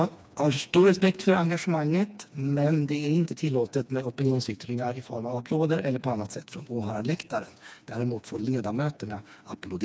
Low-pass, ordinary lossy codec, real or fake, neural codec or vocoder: none; none; fake; codec, 16 kHz, 2 kbps, FreqCodec, smaller model